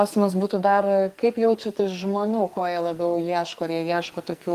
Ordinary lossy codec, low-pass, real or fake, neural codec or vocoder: Opus, 32 kbps; 14.4 kHz; fake; codec, 44.1 kHz, 2.6 kbps, SNAC